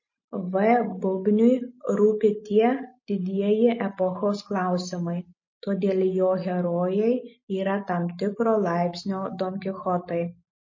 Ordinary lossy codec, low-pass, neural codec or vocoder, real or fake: MP3, 32 kbps; 7.2 kHz; none; real